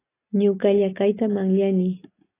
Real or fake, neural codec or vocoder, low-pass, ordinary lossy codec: real; none; 3.6 kHz; AAC, 16 kbps